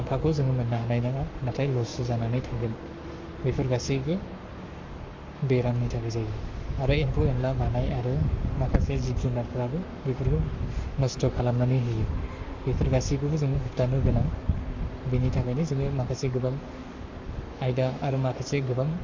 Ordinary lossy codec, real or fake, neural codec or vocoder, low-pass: MP3, 64 kbps; fake; codec, 44.1 kHz, 7.8 kbps, Pupu-Codec; 7.2 kHz